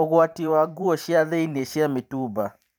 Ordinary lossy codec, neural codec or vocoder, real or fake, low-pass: none; vocoder, 44.1 kHz, 128 mel bands every 512 samples, BigVGAN v2; fake; none